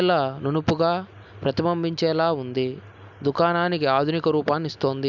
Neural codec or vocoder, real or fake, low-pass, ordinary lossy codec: none; real; 7.2 kHz; none